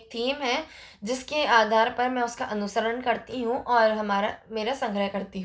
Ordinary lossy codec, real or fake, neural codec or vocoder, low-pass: none; real; none; none